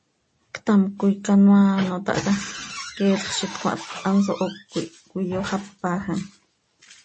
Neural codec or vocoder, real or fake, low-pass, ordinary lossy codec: none; real; 10.8 kHz; MP3, 32 kbps